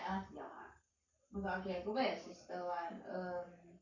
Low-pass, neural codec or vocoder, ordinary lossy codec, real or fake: 7.2 kHz; codec, 44.1 kHz, 7.8 kbps, Pupu-Codec; Opus, 64 kbps; fake